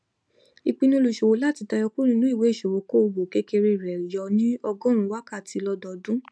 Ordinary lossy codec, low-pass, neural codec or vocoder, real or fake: none; none; none; real